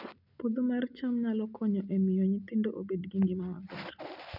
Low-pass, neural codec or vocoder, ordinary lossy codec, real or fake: 5.4 kHz; none; none; real